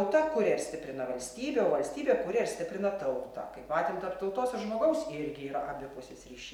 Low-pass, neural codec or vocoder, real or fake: 19.8 kHz; none; real